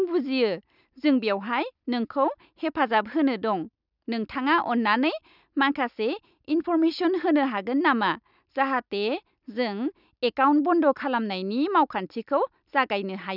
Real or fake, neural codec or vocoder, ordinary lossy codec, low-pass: real; none; none; 5.4 kHz